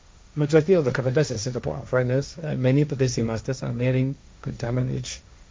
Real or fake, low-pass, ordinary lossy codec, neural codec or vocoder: fake; none; none; codec, 16 kHz, 1.1 kbps, Voila-Tokenizer